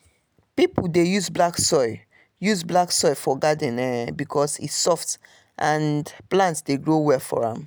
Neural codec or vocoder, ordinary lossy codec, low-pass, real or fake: none; none; none; real